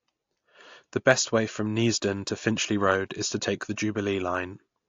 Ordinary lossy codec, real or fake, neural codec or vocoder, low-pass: AAC, 48 kbps; real; none; 7.2 kHz